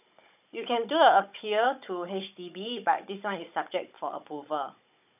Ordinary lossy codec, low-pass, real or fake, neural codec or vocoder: none; 3.6 kHz; fake; codec, 16 kHz, 16 kbps, FunCodec, trained on Chinese and English, 50 frames a second